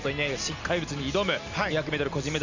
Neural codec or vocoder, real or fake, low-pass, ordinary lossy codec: none; real; 7.2 kHz; MP3, 64 kbps